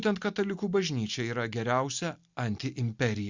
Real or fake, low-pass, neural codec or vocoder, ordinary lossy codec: real; 7.2 kHz; none; Opus, 64 kbps